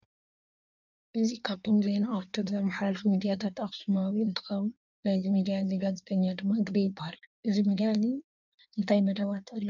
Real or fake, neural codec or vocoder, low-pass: fake; codec, 16 kHz in and 24 kHz out, 1.1 kbps, FireRedTTS-2 codec; 7.2 kHz